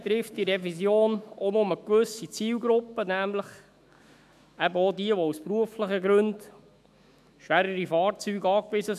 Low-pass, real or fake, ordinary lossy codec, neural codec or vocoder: 14.4 kHz; fake; MP3, 96 kbps; autoencoder, 48 kHz, 128 numbers a frame, DAC-VAE, trained on Japanese speech